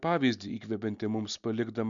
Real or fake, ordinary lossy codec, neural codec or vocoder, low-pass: real; MP3, 96 kbps; none; 7.2 kHz